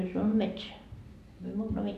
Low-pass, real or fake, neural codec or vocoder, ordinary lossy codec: 14.4 kHz; real; none; none